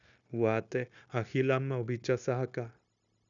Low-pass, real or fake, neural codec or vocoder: 7.2 kHz; fake; codec, 16 kHz, 0.9 kbps, LongCat-Audio-Codec